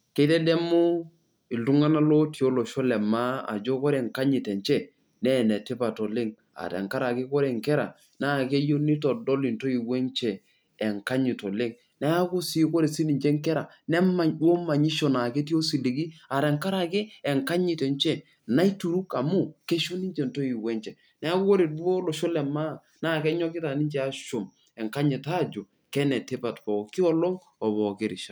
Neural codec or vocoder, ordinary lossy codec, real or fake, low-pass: none; none; real; none